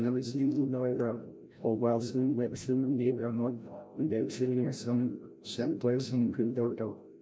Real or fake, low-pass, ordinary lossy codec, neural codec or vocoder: fake; none; none; codec, 16 kHz, 0.5 kbps, FreqCodec, larger model